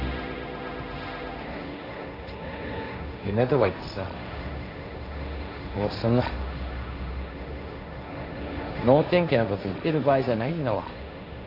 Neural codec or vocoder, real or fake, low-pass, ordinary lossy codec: codec, 16 kHz, 1.1 kbps, Voila-Tokenizer; fake; 5.4 kHz; none